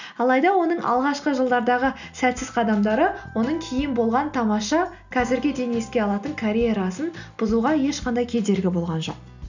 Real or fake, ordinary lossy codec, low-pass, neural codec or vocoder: real; none; 7.2 kHz; none